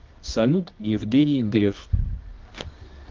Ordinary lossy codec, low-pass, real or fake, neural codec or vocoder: Opus, 24 kbps; 7.2 kHz; fake; codec, 24 kHz, 0.9 kbps, WavTokenizer, medium music audio release